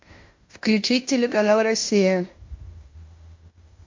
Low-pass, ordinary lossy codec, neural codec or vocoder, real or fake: 7.2 kHz; MP3, 48 kbps; codec, 16 kHz in and 24 kHz out, 0.9 kbps, LongCat-Audio-Codec, fine tuned four codebook decoder; fake